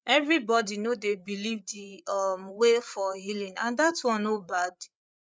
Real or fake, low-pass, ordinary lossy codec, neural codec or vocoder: fake; none; none; codec, 16 kHz, 8 kbps, FreqCodec, larger model